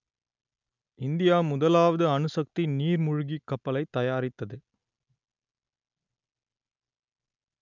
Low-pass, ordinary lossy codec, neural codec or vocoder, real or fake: 7.2 kHz; none; none; real